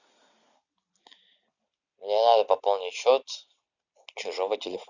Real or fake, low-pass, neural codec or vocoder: real; 7.2 kHz; none